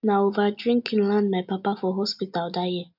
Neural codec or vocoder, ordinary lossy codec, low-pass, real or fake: none; none; 5.4 kHz; real